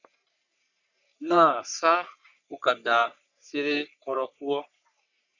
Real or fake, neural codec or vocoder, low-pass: fake; codec, 44.1 kHz, 3.4 kbps, Pupu-Codec; 7.2 kHz